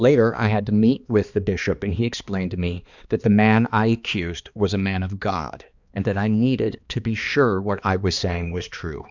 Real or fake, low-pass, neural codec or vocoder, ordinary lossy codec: fake; 7.2 kHz; codec, 16 kHz, 2 kbps, X-Codec, HuBERT features, trained on balanced general audio; Opus, 64 kbps